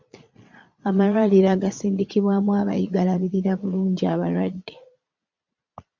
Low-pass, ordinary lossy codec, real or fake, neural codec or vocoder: 7.2 kHz; MP3, 64 kbps; fake; vocoder, 22.05 kHz, 80 mel bands, Vocos